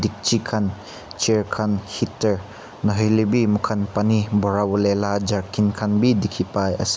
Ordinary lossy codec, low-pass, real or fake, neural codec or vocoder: none; none; real; none